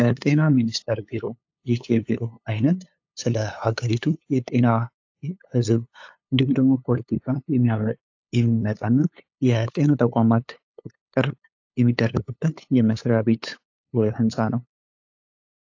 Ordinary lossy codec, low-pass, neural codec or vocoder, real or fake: AAC, 48 kbps; 7.2 kHz; codec, 16 kHz, 8 kbps, FunCodec, trained on LibriTTS, 25 frames a second; fake